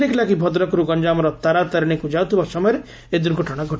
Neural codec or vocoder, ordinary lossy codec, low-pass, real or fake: none; none; none; real